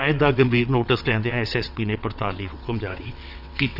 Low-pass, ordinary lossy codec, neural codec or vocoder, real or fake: 5.4 kHz; none; vocoder, 22.05 kHz, 80 mel bands, WaveNeXt; fake